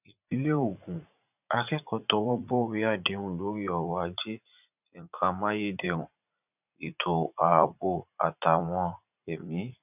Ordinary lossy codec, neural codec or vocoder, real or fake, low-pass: none; vocoder, 44.1 kHz, 80 mel bands, Vocos; fake; 3.6 kHz